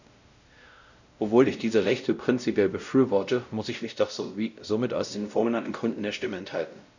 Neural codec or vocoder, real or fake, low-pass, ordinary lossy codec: codec, 16 kHz, 0.5 kbps, X-Codec, WavLM features, trained on Multilingual LibriSpeech; fake; 7.2 kHz; none